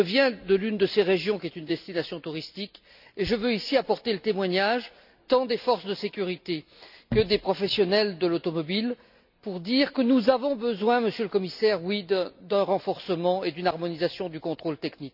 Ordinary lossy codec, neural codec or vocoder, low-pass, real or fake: none; none; 5.4 kHz; real